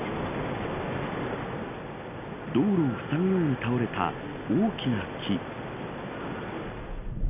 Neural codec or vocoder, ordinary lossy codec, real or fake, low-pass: none; none; real; 3.6 kHz